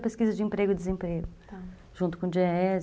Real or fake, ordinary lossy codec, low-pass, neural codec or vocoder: real; none; none; none